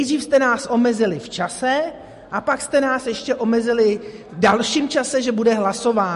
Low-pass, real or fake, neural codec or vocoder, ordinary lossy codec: 14.4 kHz; real; none; MP3, 48 kbps